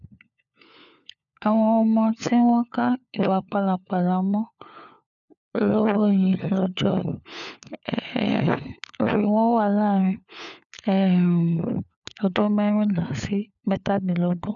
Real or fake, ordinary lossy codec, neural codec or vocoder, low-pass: fake; none; codec, 16 kHz, 4 kbps, FunCodec, trained on LibriTTS, 50 frames a second; 7.2 kHz